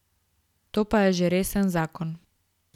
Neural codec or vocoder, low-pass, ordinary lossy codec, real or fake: none; 19.8 kHz; none; real